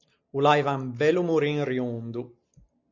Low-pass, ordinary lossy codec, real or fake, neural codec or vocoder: 7.2 kHz; MP3, 48 kbps; real; none